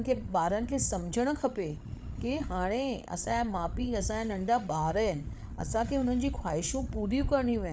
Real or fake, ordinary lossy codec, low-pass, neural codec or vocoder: fake; none; none; codec, 16 kHz, 8 kbps, FreqCodec, larger model